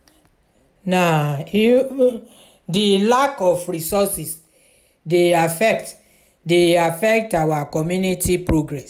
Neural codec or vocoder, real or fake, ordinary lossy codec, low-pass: none; real; none; 19.8 kHz